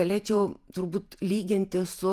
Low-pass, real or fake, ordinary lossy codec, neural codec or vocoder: 14.4 kHz; fake; Opus, 32 kbps; vocoder, 48 kHz, 128 mel bands, Vocos